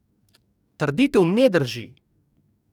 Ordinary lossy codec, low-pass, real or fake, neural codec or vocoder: none; 19.8 kHz; fake; codec, 44.1 kHz, 2.6 kbps, DAC